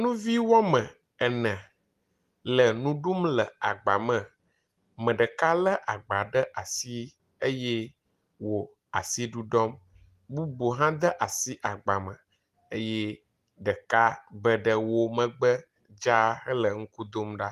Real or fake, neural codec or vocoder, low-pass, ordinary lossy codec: real; none; 14.4 kHz; Opus, 24 kbps